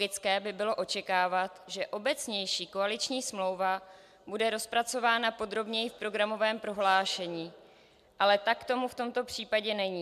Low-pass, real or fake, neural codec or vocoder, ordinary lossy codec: 14.4 kHz; real; none; MP3, 96 kbps